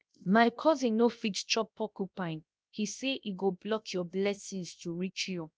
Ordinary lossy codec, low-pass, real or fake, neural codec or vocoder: none; none; fake; codec, 16 kHz, 0.7 kbps, FocalCodec